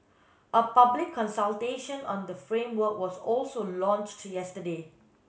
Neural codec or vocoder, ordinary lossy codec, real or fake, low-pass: none; none; real; none